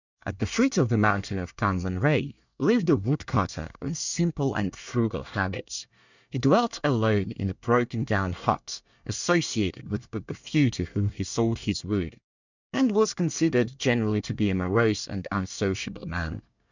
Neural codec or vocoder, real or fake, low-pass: codec, 24 kHz, 1 kbps, SNAC; fake; 7.2 kHz